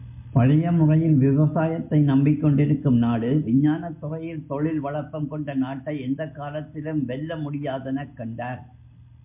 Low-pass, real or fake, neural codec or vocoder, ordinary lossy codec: 3.6 kHz; real; none; MP3, 32 kbps